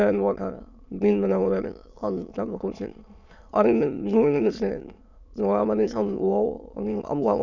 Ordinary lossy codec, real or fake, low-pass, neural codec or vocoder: none; fake; 7.2 kHz; autoencoder, 22.05 kHz, a latent of 192 numbers a frame, VITS, trained on many speakers